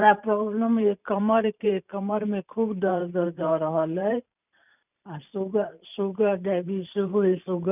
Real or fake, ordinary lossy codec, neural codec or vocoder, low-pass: fake; none; vocoder, 44.1 kHz, 128 mel bands every 512 samples, BigVGAN v2; 3.6 kHz